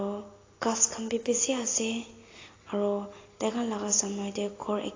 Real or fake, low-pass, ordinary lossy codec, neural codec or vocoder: real; 7.2 kHz; AAC, 32 kbps; none